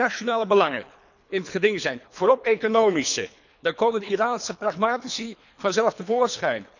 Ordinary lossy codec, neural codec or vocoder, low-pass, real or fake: none; codec, 24 kHz, 3 kbps, HILCodec; 7.2 kHz; fake